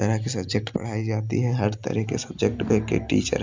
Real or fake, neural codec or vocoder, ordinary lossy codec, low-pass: real; none; MP3, 64 kbps; 7.2 kHz